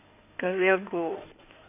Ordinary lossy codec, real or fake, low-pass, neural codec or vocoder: none; fake; 3.6 kHz; codec, 16 kHz in and 24 kHz out, 2.2 kbps, FireRedTTS-2 codec